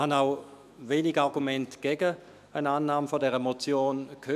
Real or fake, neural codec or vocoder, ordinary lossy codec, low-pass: fake; autoencoder, 48 kHz, 128 numbers a frame, DAC-VAE, trained on Japanese speech; none; 14.4 kHz